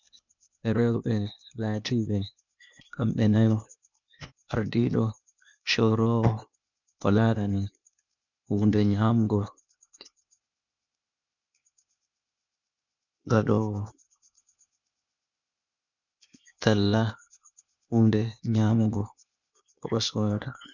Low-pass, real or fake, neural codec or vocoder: 7.2 kHz; fake; codec, 16 kHz, 0.8 kbps, ZipCodec